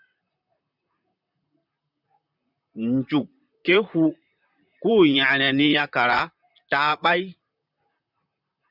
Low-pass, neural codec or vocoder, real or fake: 5.4 kHz; vocoder, 44.1 kHz, 128 mel bands, Pupu-Vocoder; fake